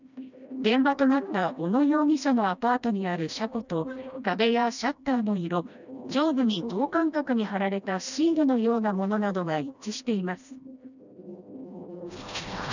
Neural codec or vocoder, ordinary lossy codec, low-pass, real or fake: codec, 16 kHz, 1 kbps, FreqCodec, smaller model; none; 7.2 kHz; fake